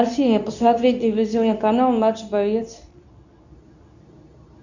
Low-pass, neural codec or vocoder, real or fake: 7.2 kHz; codec, 24 kHz, 0.9 kbps, WavTokenizer, medium speech release version 2; fake